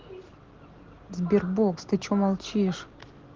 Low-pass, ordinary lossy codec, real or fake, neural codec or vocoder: 7.2 kHz; Opus, 16 kbps; real; none